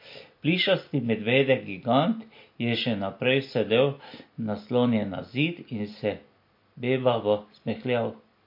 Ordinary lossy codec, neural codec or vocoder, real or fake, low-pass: MP3, 32 kbps; none; real; 5.4 kHz